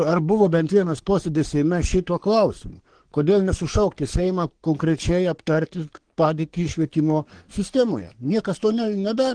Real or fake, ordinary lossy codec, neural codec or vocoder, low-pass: fake; Opus, 16 kbps; codec, 44.1 kHz, 3.4 kbps, Pupu-Codec; 9.9 kHz